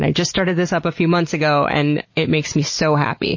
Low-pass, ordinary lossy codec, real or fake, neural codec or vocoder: 7.2 kHz; MP3, 32 kbps; real; none